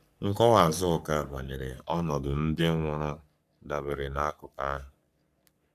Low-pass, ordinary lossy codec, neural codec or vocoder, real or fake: 14.4 kHz; none; codec, 44.1 kHz, 3.4 kbps, Pupu-Codec; fake